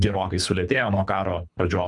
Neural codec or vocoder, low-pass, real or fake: codec, 24 kHz, 3 kbps, HILCodec; 10.8 kHz; fake